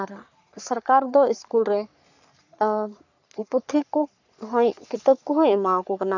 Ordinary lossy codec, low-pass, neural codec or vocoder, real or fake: none; 7.2 kHz; codec, 44.1 kHz, 3.4 kbps, Pupu-Codec; fake